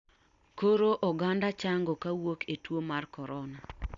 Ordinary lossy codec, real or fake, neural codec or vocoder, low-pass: Opus, 64 kbps; real; none; 7.2 kHz